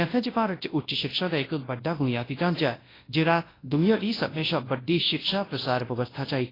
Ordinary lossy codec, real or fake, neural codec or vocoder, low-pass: AAC, 24 kbps; fake; codec, 24 kHz, 0.9 kbps, WavTokenizer, large speech release; 5.4 kHz